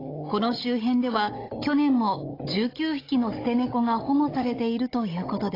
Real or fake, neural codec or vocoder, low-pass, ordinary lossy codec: fake; codec, 16 kHz, 16 kbps, FunCodec, trained on Chinese and English, 50 frames a second; 5.4 kHz; AAC, 24 kbps